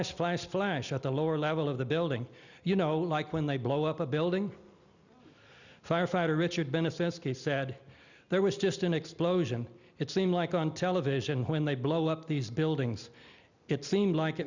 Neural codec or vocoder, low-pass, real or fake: none; 7.2 kHz; real